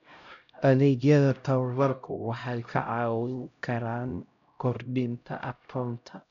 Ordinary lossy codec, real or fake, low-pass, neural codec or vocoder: none; fake; 7.2 kHz; codec, 16 kHz, 0.5 kbps, X-Codec, HuBERT features, trained on LibriSpeech